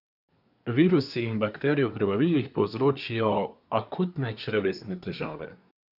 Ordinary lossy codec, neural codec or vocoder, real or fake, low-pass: none; codec, 24 kHz, 1 kbps, SNAC; fake; 5.4 kHz